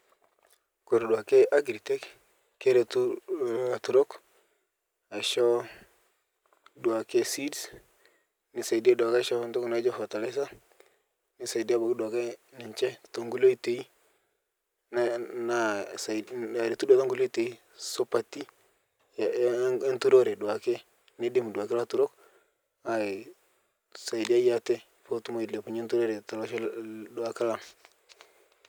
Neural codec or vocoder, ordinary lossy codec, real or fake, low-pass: none; none; real; none